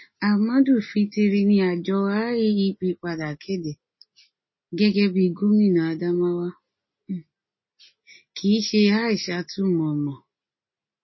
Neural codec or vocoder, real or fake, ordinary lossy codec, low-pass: none; real; MP3, 24 kbps; 7.2 kHz